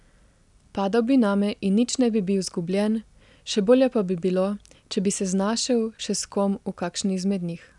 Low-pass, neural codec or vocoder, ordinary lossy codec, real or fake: 10.8 kHz; none; none; real